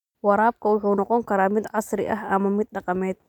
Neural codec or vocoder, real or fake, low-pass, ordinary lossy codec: none; real; 19.8 kHz; none